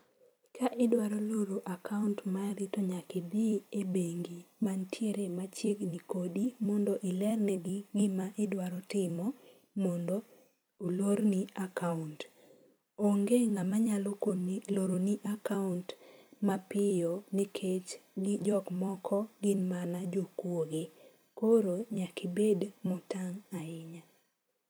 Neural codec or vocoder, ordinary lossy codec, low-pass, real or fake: vocoder, 44.1 kHz, 128 mel bands every 256 samples, BigVGAN v2; none; none; fake